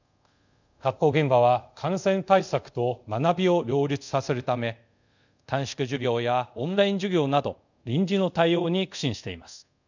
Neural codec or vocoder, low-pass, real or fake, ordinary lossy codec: codec, 24 kHz, 0.5 kbps, DualCodec; 7.2 kHz; fake; none